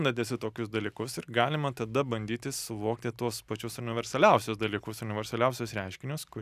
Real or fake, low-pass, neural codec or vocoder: real; 14.4 kHz; none